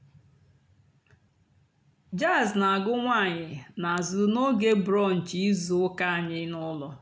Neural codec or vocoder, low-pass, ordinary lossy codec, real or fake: none; none; none; real